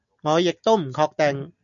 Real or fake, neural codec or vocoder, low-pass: real; none; 7.2 kHz